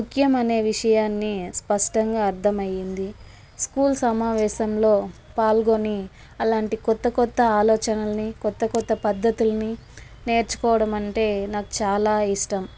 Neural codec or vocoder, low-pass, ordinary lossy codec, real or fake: none; none; none; real